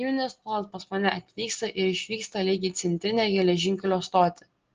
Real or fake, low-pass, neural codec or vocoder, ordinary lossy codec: real; 7.2 kHz; none; Opus, 16 kbps